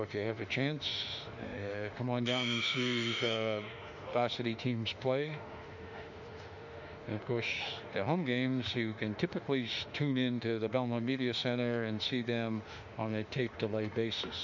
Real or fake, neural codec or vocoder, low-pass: fake; autoencoder, 48 kHz, 32 numbers a frame, DAC-VAE, trained on Japanese speech; 7.2 kHz